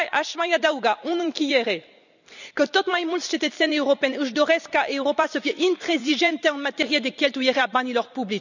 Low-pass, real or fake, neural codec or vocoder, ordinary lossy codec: 7.2 kHz; fake; vocoder, 44.1 kHz, 128 mel bands every 256 samples, BigVGAN v2; none